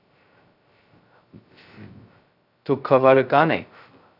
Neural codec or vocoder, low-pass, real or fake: codec, 16 kHz, 0.2 kbps, FocalCodec; 5.4 kHz; fake